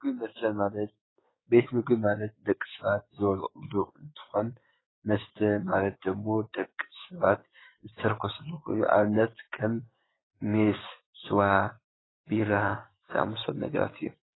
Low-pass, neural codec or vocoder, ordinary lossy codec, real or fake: 7.2 kHz; codec, 16 kHz, 6 kbps, DAC; AAC, 16 kbps; fake